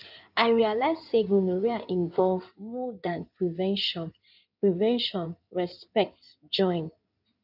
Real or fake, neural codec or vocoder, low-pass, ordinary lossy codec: fake; codec, 16 kHz in and 24 kHz out, 2.2 kbps, FireRedTTS-2 codec; 5.4 kHz; none